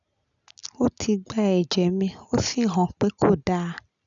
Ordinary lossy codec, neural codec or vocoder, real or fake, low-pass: none; none; real; 7.2 kHz